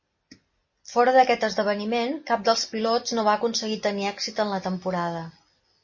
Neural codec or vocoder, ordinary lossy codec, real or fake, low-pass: none; MP3, 32 kbps; real; 7.2 kHz